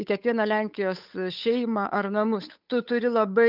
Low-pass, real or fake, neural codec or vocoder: 5.4 kHz; fake; codec, 16 kHz, 8 kbps, FunCodec, trained on Chinese and English, 25 frames a second